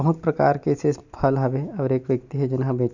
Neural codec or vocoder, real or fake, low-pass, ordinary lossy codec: none; real; 7.2 kHz; none